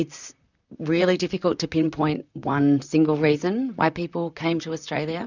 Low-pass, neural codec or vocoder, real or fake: 7.2 kHz; vocoder, 44.1 kHz, 128 mel bands, Pupu-Vocoder; fake